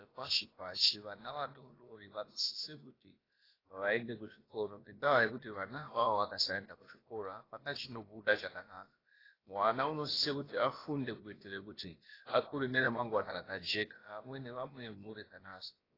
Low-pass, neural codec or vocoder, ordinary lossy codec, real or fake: 5.4 kHz; codec, 16 kHz, about 1 kbps, DyCAST, with the encoder's durations; AAC, 24 kbps; fake